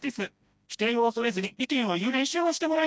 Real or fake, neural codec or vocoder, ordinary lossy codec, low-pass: fake; codec, 16 kHz, 1 kbps, FreqCodec, smaller model; none; none